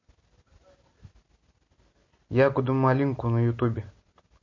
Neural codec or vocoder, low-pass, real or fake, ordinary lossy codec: none; 7.2 kHz; real; MP3, 32 kbps